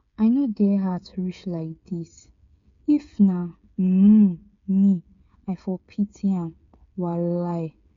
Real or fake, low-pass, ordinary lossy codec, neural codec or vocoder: fake; 7.2 kHz; MP3, 64 kbps; codec, 16 kHz, 8 kbps, FreqCodec, smaller model